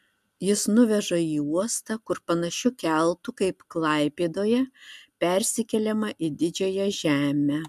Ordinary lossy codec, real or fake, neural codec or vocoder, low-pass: MP3, 96 kbps; real; none; 14.4 kHz